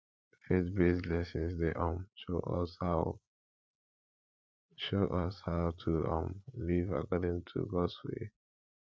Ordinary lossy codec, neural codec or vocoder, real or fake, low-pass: none; codec, 16 kHz, 8 kbps, FreqCodec, larger model; fake; none